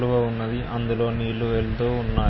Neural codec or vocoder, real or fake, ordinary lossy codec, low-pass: none; real; MP3, 24 kbps; 7.2 kHz